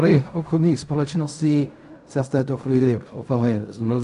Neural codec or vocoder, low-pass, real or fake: codec, 16 kHz in and 24 kHz out, 0.4 kbps, LongCat-Audio-Codec, fine tuned four codebook decoder; 10.8 kHz; fake